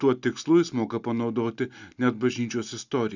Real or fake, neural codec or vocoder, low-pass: real; none; 7.2 kHz